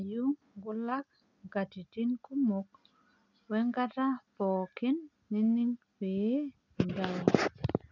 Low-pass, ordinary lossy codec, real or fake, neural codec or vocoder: 7.2 kHz; none; real; none